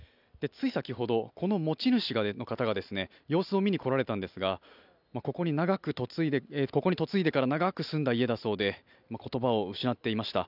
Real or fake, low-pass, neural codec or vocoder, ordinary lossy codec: real; 5.4 kHz; none; none